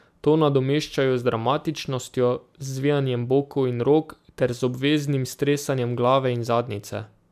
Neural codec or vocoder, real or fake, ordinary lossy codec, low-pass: none; real; MP3, 96 kbps; 14.4 kHz